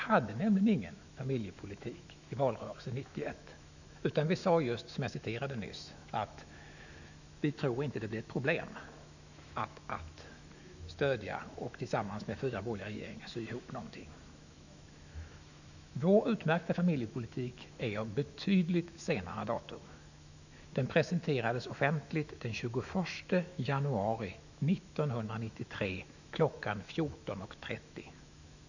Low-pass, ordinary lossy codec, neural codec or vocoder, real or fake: 7.2 kHz; none; autoencoder, 48 kHz, 128 numbers a frame, DAC-VAE, trained on Japanese speech; fake